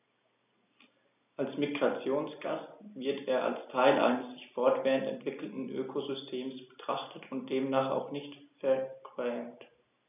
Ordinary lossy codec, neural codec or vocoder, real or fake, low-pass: none; none; real; 3.6 kHz